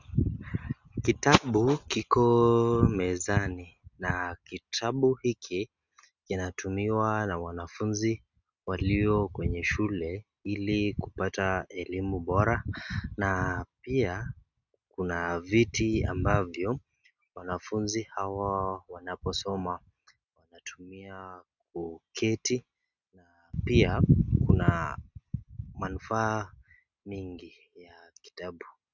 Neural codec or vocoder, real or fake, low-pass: none; real; 7.2 kHz